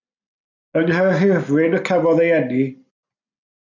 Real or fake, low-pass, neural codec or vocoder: real; 7.2 kHz; none